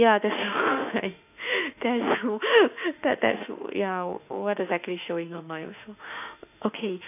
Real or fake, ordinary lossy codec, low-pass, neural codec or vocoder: fake; none; 3.6 kHz; autoencoder, 48 kHz, 32 numbers a frame, DAC-VAE, trained on Japanese speech